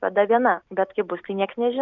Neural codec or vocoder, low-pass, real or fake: none; 7.2 kHz; real